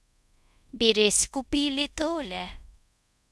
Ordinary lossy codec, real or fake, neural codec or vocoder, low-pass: none; fake; codec, 24 kHz, 0.5 kbps, DualCodec; none